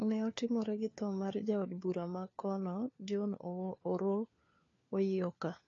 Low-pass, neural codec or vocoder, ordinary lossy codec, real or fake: 7.2 kHz; codec, 16 kHz, 4 kbps, FunCodec, trained on LibriTTS, 50 frames a second; AAC, 32 kbps; fake